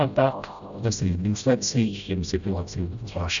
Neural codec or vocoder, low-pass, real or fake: codec, 16 kHz, 0.5 kbps, FreqCodec, smaller model; 7.2 kHz; fake